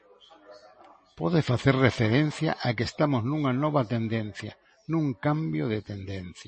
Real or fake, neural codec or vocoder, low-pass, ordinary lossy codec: real; none; 10.8 kHz; MP3, 32 kbps